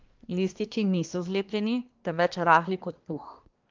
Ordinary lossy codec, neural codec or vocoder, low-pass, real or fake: Opus, 24 kbps; codec, 24 kHz, 1 kbps, SNAC; 7.2 kHz; fake